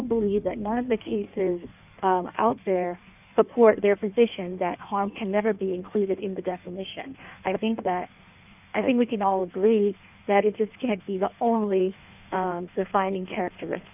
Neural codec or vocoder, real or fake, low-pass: codec, 16 kHz in and 24 kHz out, 1.1 kbps, FireRedTTS-2 codec; fake; 3.6 kHz